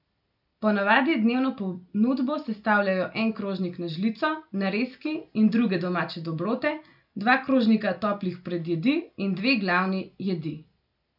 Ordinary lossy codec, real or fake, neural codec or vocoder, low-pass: none; real; none; 5.4 kHz